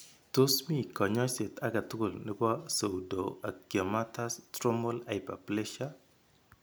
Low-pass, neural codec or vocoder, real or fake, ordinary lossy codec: none; none; real; none